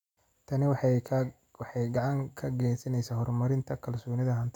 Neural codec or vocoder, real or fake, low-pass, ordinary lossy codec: none; real; 19.8 kHz; none